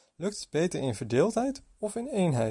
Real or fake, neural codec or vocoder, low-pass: real; none; 10.8 kHz